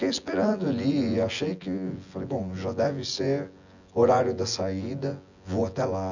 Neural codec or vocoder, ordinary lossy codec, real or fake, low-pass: vocoder, 24 kHz, 100 mel bands, Vocos; none; fake; 7.2 kHz